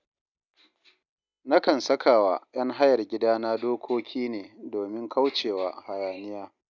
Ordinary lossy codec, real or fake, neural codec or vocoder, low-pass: none; real; none; 7.2 kHz